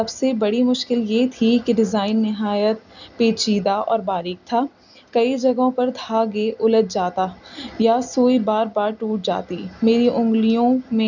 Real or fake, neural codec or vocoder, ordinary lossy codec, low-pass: real; none; none; 7.2 kHz